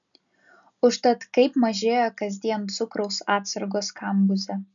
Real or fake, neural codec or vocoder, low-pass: real; none; 7.2 kHz